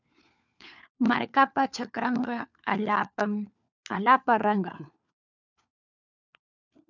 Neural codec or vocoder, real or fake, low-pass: codec, 16 kHz, 4 kbps, FunCodec, trained on LibriTTS, 50 frames a second; fake; 7.2 kHz